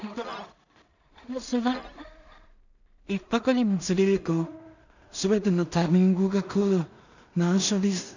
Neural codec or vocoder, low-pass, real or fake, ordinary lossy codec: codec, 16 kHz in and 24 kHz out, 0.4 kbps, LongCat-Audio-Codec, two codebook decoder; 7.2 kHz; fake; none